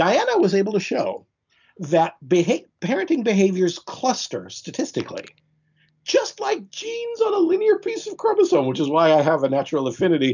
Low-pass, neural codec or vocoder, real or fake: 7.2 kHz; none; real